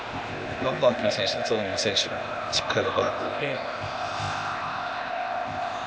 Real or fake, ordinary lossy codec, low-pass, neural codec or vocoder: fake; none; none; codec, 16 kHz, 0.8 kbps, ZipCodec